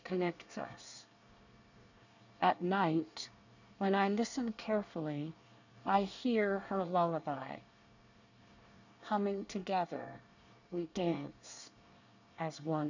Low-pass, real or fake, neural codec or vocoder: 7.2 kHz; fake; codec, 24 kHz, 1 kbps, SNAC